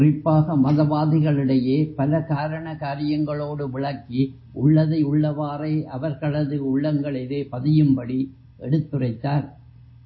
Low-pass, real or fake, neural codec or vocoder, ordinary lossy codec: 7.2 kHz; real; none; MP3, 24 kbps